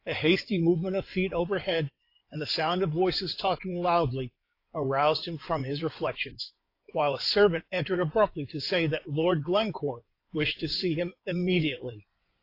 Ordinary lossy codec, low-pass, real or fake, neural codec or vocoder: AAC, 32 kbps; 5.4 kHz; fake; codec, 16 kHz in and 24 kHz out, 2.2 kbps, FireRedTTS-2 codec